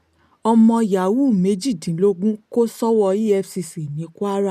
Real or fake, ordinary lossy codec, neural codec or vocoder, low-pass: real; none; none; 14.4 kHz